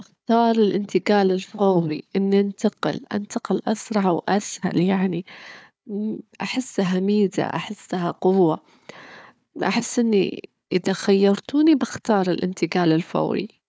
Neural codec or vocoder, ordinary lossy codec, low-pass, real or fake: codec, 16 kHz, 4 kbps, FunCodec, trained on Chinese and English, 50 frames a second; none; none; fake